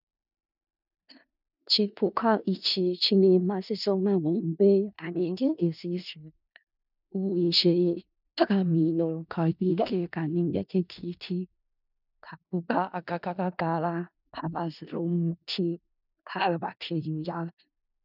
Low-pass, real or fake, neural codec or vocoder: 5.4 kHz; fake; codec, 16 kHz in and 24 kHz out, 0.4 kbps, LongCat-Audio-Codec, four codebook decoder